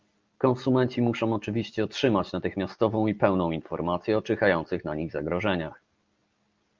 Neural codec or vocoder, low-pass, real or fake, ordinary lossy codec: none; 7.2 kHz; real; Opus, 24 kbps